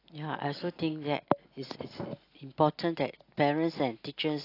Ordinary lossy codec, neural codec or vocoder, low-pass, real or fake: AAC, 32 kbps; none; 5.4 kHz; real